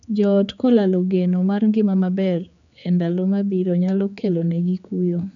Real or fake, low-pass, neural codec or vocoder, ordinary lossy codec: fake; 7.2 kHz; codec, 16 kHz, 4 kbps, X-Codec, HuBERT features, trained on general audio; none